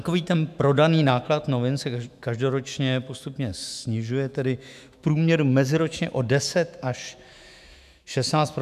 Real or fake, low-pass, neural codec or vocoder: fake; 14.4 kHz; autoencoder, 48 kHz, 128 numbers a frame, DAC-VAE, trained on Japanese speech